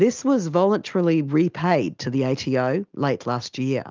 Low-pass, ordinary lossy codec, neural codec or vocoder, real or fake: 7.2 kHz; Opus, 24 kbps; none; real